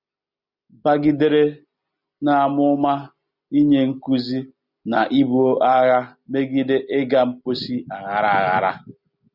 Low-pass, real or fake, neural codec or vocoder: 5.4 kHz; real; none